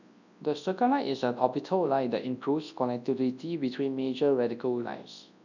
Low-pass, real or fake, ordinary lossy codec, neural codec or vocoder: 7.2 kHz; fake; none; codec, 24 kHz, 0.9 kbps, WavTokenizer, large speech release